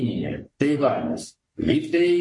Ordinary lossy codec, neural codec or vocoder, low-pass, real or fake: MP3, 48 kbps; codec, 44.1 kHz, 3.4 kbps, Pupu-Codec; 10.8 kHz; fake